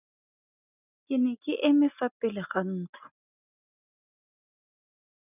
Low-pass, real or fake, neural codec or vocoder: 3.6 kHz; real; none